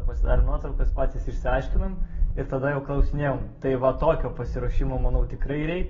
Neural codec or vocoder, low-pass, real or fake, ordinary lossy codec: none; 7.2 kHz; real; AAC, 24 kbps